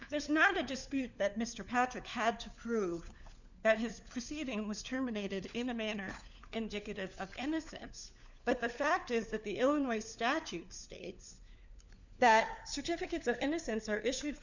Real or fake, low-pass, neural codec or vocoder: fake; 7.2 kHz; codec, 16 kHz, 4 kbps, FunCodec, trained on LibriTTS, 50 frames a second